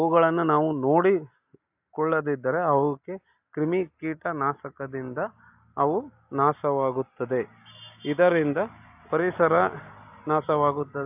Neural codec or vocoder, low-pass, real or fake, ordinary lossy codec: none; 3.6 kHz; real; AAC, 32 kbps